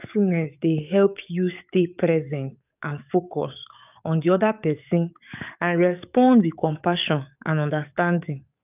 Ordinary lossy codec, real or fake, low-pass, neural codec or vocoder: none; fake; 3.6 kHz; codec, 44.1 kHz, 7.8 kbps, DAC